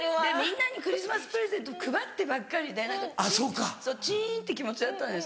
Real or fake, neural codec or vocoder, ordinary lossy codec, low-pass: real; none; none; none